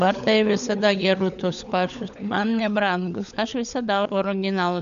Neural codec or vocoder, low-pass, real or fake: codec, 16 kHz, 16 kbps, FunCodec, trained on LibriTTS, 50 frames a second; 7.2 kHz; fake